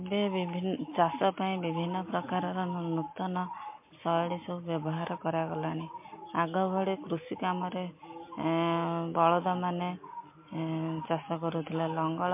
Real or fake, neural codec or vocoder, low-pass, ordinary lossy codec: real; none; 3.6 kHz; MP3, 24 kbps